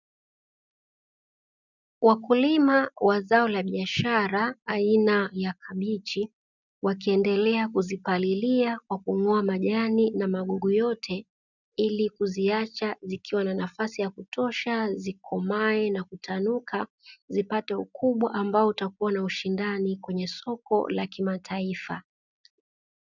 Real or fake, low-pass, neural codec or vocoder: real; 7.2 kHz; none